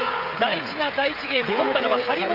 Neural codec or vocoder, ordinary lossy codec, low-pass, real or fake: codec, 16 kHz, 16 kbps, FreqCodec, smaller model; none; 5.4 kHz; fake